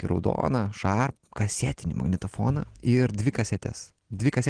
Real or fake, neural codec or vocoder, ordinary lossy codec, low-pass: real; none; Opus, 16 kbps; 9.9 kHz